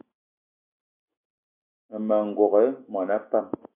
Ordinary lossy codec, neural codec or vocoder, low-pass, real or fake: MP3, 32 kbps; none; 3.6 kHz; real